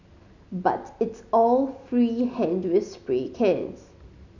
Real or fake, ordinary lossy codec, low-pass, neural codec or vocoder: real; none; 7.2 kHz; none